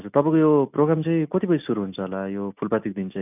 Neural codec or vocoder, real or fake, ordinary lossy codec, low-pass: none; real; none; 3.6 kHz